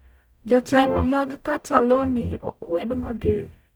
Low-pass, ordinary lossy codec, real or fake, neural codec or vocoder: none; none; fake; codec, 44.1 kHz, 0.9 kbps, DAC